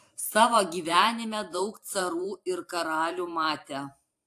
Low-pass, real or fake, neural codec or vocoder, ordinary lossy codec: 14.4 kHz; fake; vocoder, 44.1 kHz, 128 mel bands every 512 samples, BigVGAN v2; AAC, 64 kbps